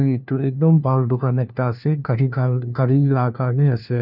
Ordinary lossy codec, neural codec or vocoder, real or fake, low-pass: none; codec, 16 kHz, 1 kbps, FunCodec, trained on LibriTTS, 50 frames a second; fake; 5.4 kHz